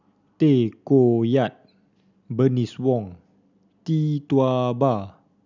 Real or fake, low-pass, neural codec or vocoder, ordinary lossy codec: real; 7.2 kHz; none; none